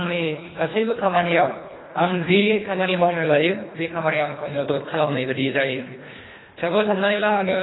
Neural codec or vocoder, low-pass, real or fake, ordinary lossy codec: codec, 24 kHz, 1.5 kbps, HILCodec; 7.2 kHz; fake; AAC, 16 kbps